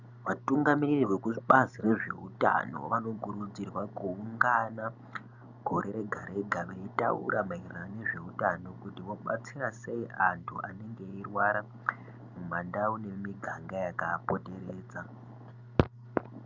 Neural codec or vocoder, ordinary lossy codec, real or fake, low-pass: none; Opus, 64 kbps; real; 7.2 kHz